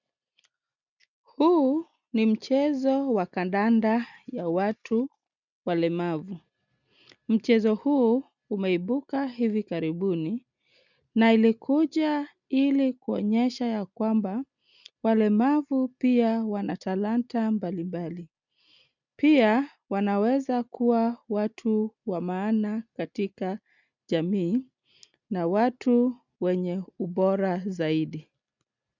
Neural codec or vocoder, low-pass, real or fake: none; 7.2 kHz; real